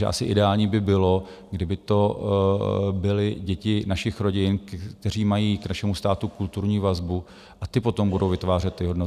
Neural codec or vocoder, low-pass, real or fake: none; 14.4 kHz; real